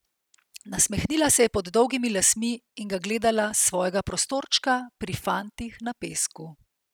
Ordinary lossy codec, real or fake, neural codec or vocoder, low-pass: none; real; none; none